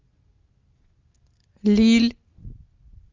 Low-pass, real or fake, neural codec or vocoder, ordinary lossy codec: 7.2 kHz; real; none; Opus, 32 kbps